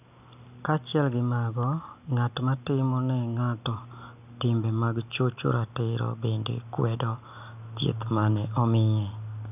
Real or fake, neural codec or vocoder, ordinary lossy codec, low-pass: real; none; none; 3.6 kHz